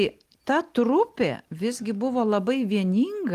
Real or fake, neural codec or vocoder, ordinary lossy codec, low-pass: real; none; Opus, 24 kbps; 14.4 kHz